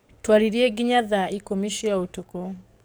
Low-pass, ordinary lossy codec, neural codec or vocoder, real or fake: none; none; codec, 44.1 kHz, 7.8 kbps, DAC; fake